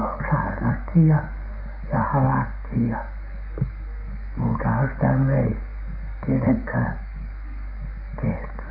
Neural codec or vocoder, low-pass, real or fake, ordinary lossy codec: codec, 44.1 kHz, 7.8 kbps, DAC; 5.4 kHz; fake; none